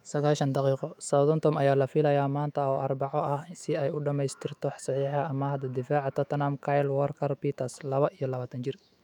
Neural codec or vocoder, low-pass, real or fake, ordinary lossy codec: autoencoder, 48 kHz, 128 numbers a frame, DAC-VAE, trained on Japanese speech; 19.8 kHz; fake; none